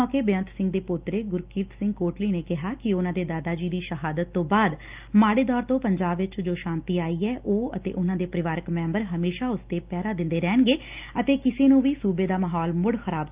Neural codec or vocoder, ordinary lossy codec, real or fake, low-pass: none; Opus, 24 kbps; real; 3.6 kHz